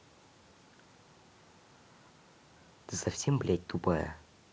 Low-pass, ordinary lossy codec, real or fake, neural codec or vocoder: none; none; real; none